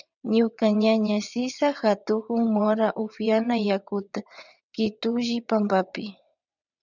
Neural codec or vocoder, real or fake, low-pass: vocoder, 22.05 kHz, 80 mel bands, WaveNeXt; fake; 7.2 kHz